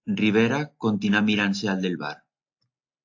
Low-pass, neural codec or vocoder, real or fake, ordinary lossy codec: 7.2 kHz; none; real; AAC, 48 kbps